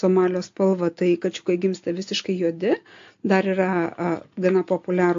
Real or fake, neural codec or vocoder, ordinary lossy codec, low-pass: real; none; AAC, 48 kbps; 7.2 kHz